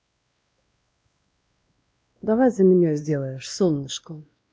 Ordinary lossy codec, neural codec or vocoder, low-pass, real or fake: none; codec, 16 kHz, 1 kbps, X-Codec, WavLM features, trained on Multilingual LibriSpeech; none; fake